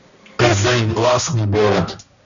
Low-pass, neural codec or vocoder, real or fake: 7.2 kHz; codec, 16 kHz, 1 kbps, X-Codec, HuBERT features, trained on balanced general audio; fake